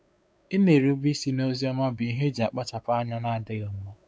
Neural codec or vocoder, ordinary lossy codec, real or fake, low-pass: codec, 16 kHz, 4 kbps, X-Codec, WavLM features, trained on Multilingual LibriSpeech; none; fake; none